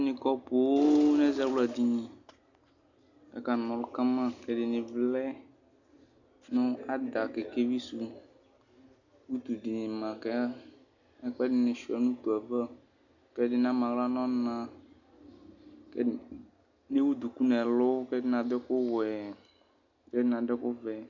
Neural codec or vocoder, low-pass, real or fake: none; 7.2 kHz; real